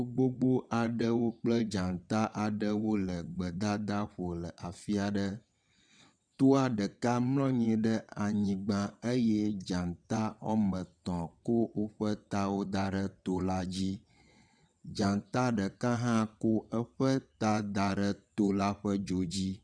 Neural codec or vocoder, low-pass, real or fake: vocoder, 22.05 kHz, 80 mel bands, WaveNeXt; 9.9 kHz; fake